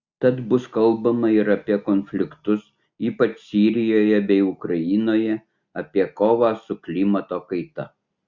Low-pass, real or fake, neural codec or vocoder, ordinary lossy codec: 7.2 kHz; real; none; Opus, 64 kbps